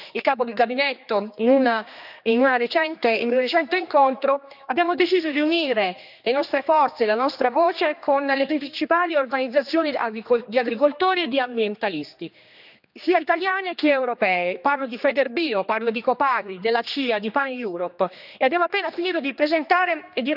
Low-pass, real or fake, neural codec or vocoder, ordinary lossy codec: 5.4 kHz; fake; codec, 16 kHz, 2 kbps, X-Codec, HuBERT features, trained on general audio; none